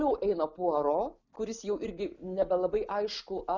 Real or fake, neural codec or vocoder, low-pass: real; none; 7.2 kHz